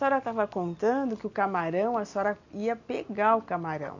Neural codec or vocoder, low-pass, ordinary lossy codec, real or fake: none; 7.2 kHz; none; real